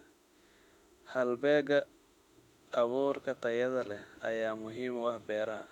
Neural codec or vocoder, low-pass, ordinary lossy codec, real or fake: autoencoder, 48 kHz, 32 numbers a frame, DAC-VAE, trained on Japanese speech; 19.8 kHz; none; fake